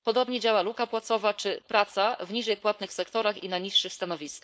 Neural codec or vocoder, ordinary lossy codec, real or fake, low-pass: codec, 16 kHz, 4.8 kbps, FACodec; none; fake; none